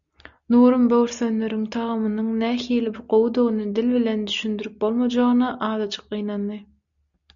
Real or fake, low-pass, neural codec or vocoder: real; 7.2 kHz; none